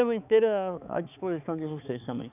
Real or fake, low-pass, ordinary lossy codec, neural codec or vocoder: fake; 3.6 kHz; none; codec, 16 kHz, 4 kbps, X-Codec, HuBERT features, trained on balanced general audio